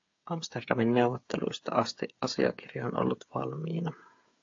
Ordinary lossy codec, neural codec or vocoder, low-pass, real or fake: AAC, 48 kbps; codec, 16 kHz, 8 kbps, FreqCodec, smaller model; 7.2 kHz; fake